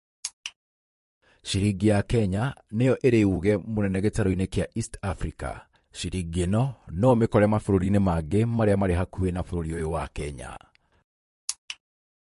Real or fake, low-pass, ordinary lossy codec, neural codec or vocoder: fake; 14.4 kHz; MP3, 48 kbps; vocoder, 44.1 kHz, 128 mel bands, Pupu-Vocoder